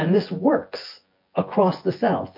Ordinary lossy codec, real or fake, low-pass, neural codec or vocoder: MP3, 32 kbps; fake; 5.4 kHz; vocoder, 24 kHz, 100 mel bands, Vocos